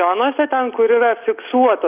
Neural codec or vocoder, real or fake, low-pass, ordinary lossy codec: none; real; 3.6 kHz; Opus, 32 kbps